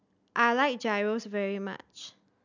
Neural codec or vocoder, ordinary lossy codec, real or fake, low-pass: none; none; real; 7.2 kHz